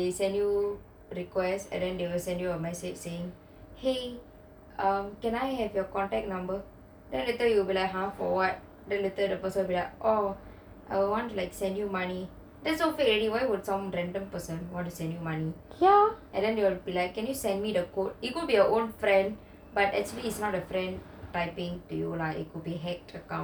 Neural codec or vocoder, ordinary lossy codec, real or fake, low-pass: none; none; real; none